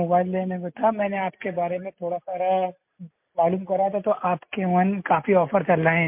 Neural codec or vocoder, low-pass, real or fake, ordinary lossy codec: none; 3.6 kHz; real; MP3, 32 kbps